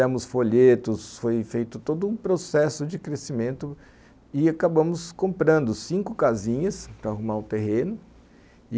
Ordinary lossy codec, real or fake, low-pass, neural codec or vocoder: none; real; none; none